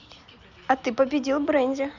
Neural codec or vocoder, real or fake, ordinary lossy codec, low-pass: none; real; none; 7.2 kHz